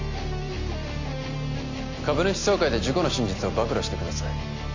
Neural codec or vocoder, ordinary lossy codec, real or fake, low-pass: none; AAC, 48 kbps; real; 7.2 kHz